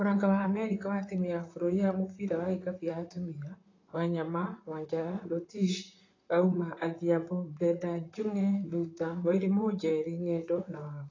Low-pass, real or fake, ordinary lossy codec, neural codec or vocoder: 7.2 kHz; fake; AAC, 32 kbps; vocoder, 44.1 kHz, 128 mel bands, Pupu-Vocoder